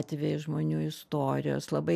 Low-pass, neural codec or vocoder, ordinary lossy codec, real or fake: 14.4 kHz; none; AAC, 96 kbps; real